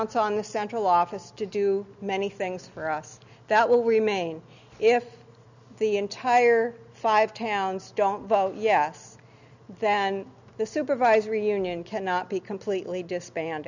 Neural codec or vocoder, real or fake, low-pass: none; real; 7.2 kHz